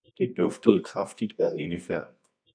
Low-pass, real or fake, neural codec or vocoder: 9.9 kHz; fake; codec, 24 kHz, 0.9 kbps, WavTokenizer, medium music audio release